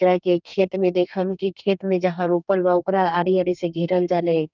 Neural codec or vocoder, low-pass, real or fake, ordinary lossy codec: codec, 32 kHz, 1.9 kbps, SNAC; 7.2 kHz; fake; none